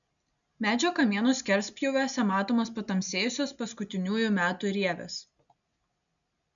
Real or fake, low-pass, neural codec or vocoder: real; 7.2 kHz; none